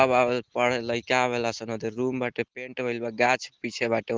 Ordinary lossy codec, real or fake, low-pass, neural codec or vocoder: Opus, 16 kbps; real; 7.2 kHz; none